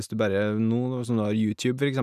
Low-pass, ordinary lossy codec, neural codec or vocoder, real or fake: 14.4 kHz; none; none; real